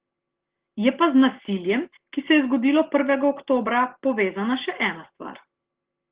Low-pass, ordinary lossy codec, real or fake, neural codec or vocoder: 3.6 kHz; Opus, 16 kbps; real; none